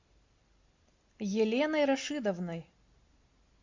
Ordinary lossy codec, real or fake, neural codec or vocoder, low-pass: MP3, 48 kbps; real; none; 7.2 kHz